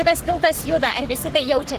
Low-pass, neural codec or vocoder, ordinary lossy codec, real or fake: 14.4 kHz; codec, 44.1 kHz, 3.4 kbps, Pupu-Codec; Opus, 16 kbps; fake